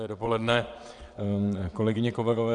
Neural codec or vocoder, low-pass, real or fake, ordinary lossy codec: vocoder, 22.05 kHz, 80 mel bands, WaveNeXt; 9.9 kHz; fake; Opus, 64 kbps